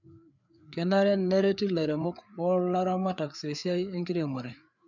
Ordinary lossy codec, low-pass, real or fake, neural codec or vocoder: none; 7.2 kHz; fake; codec, 16 kHz, 4 kbps, FreqCodec, larger model